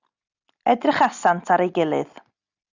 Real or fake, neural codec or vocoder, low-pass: real; none; 7.2 kHz